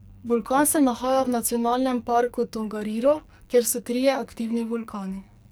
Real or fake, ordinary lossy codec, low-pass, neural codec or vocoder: fake; none; none; codec, 44.1 kHz, 2.6 kbps, SNAC